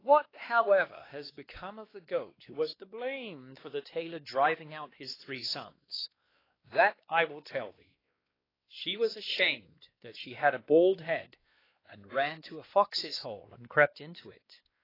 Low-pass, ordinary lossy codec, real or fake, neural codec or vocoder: 5.4 kHz; AAC, 24 kbps; fake; codec, 16 kHz, 2 kbps, X-Codec, WavLM features, trained on Multilingual LibriSpeech